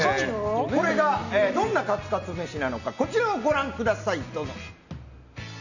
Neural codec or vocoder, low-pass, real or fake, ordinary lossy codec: none; 7.2 kHz; real; none